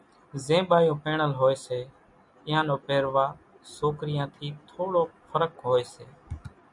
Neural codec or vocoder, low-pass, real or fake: none; 10.8 kHz; real